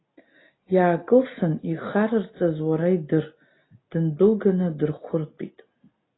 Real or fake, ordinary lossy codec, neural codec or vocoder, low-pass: real; AAC, 16 kbps; none; 7.2 kHz